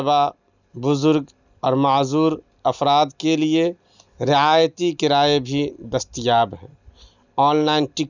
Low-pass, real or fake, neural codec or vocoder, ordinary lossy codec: 7.2 kHz; real; none; none